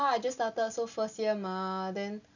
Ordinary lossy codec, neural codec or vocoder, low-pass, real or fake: none; none; 7.2 kHz; real